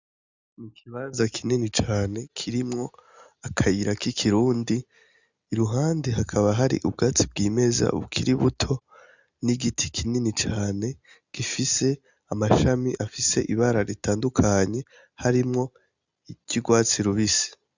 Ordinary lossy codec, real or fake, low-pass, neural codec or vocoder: Opus, 64 kbps; real; 7.2 kHz; none